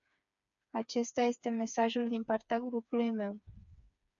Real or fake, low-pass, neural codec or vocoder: fake; 7.2 kHz; codec, 16 kHz, 4 kbps, FreqCodec, smaller model